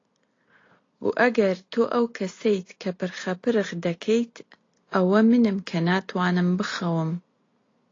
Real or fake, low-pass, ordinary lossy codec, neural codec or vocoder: real; 7.2 kHz; AAC, 32 kbps; none